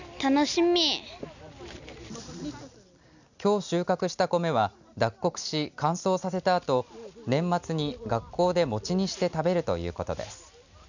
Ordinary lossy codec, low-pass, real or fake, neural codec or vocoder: none; 7.2 kHz; real; none